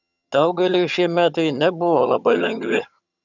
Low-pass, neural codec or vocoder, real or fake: 7.2 kHz; vocoder, 22.05 kHz, 80 mel bands, HiFi-GAN; fake